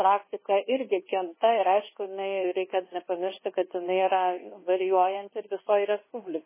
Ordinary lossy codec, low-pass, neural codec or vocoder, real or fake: MP3, 16 kbps; 3.6 kHz; codec, 24 kHz, 1.2 kbps, DualCodec; fake